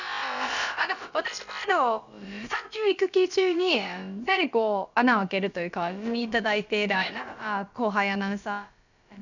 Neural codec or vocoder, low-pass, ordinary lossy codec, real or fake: codec, 16 kHz, about 1 kbps, DyCAST, with the encoder's durations; 7.2 kHz; none; fake